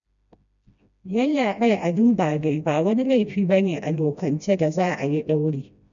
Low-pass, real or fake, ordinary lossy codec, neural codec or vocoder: 7.2 kHz; fake; none; codec, 16 kHz, 1 kbps, FreqCodec, smaller model